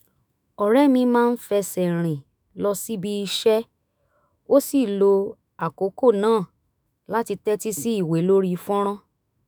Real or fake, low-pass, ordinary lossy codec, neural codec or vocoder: fake; none; none; autoencoder, 48 kHz, 128 numbers a frame, DAC-VAE, trained on Japanese speech